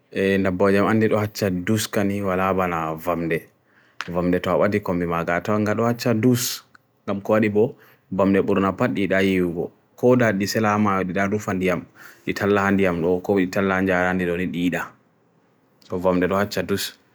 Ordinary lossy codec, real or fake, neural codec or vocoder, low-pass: none; real; none; none